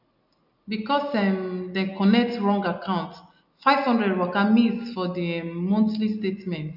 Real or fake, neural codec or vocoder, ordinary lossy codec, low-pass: real; none; none; 5.4 kHz